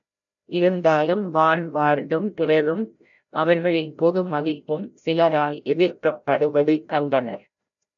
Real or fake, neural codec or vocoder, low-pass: fake; codec, 16 kHz, 0.5 kbps, FreqCodec, larger model; 7.2 kHz